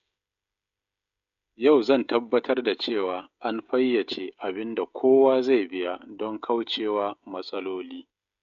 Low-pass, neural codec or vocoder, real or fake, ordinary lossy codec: 7.2 kHz; codec, 16 kHz, 16 kbps, FreqCodec, smaller model; fake; none